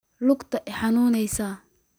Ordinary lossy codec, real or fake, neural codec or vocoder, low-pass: none; real; none; none